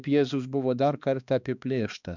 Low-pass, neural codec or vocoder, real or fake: 7.2 kHz; codec, 16 kHz, 2 kbps, X-Codec, HuBERT features, trained on balanced general audio; fake